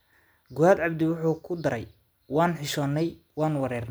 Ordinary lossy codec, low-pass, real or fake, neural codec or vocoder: none; none; real; none